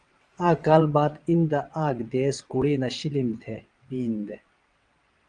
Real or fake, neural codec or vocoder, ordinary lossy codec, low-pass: fake; vocoder, 22.05 kHz, 80 mel bands, WaveNeXt; Opus, 32 kbps; 9.9 kHz